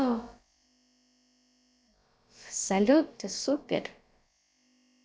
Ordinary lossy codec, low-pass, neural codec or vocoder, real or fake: none; none; codec, 16 kHz, about 1 kbps, DyCAST, with the encoder's durations; fake